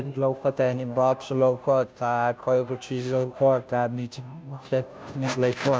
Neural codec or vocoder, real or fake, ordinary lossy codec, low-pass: codec, 16 kHz, 0.5 kbps, FunCodec, trained on Chinese and English, 25 frames a second; fake; none; none